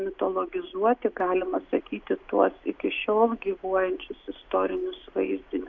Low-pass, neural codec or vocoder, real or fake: 7.2 kHz; none; real